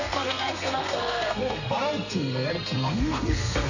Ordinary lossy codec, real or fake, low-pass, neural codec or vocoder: none; fake; 7.2 kHz; codec, 32 kHz, 1.9 kbps, SNAC